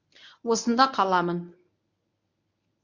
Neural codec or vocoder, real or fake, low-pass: codec, 24 kHz, 0.9 kbps, WavTokenizer, medium speech release version 1; fake; 7.2 kHz